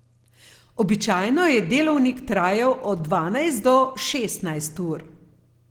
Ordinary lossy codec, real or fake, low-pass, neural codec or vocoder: Opus, 16 kbps; real; 19.8 kHz; none